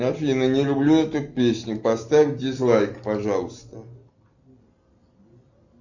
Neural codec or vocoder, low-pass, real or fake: none; 7.2 kHz; real